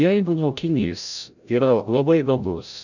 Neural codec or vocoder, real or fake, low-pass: codec, 16 kHz, 0.5 kbps, FreqCodec, larger model; fake; 7.2 kHz